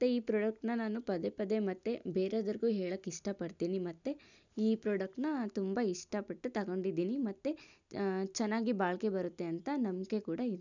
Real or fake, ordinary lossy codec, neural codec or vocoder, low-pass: real; none; none; 7.2 kHz